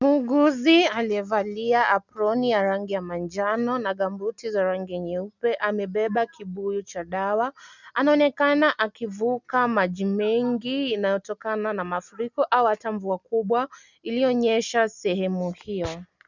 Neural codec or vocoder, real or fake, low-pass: none; real; 7.2 kHz